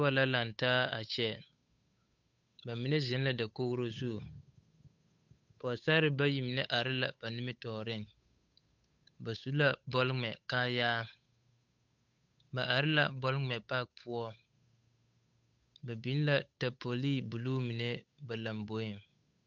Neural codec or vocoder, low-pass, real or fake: codec, 16 kHz, 8 kbps, FunCodec, trained on Chinese and English, 25 frames a second; 7.2 kHz; fake